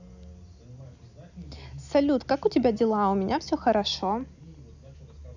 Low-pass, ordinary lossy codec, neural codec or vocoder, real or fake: 7.2 kHz; none; none; real